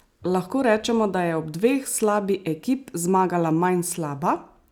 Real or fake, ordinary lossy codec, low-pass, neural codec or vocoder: real; none; none; none